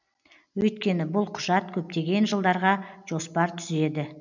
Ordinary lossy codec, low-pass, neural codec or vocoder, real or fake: none; 7.2 kHz; none; real